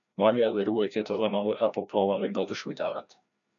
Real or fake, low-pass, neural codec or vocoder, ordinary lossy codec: fake; 7.2 kHz; codec, 16 kHz, 1 kbps, FreqCodec, larger model; MP3, 96 kbps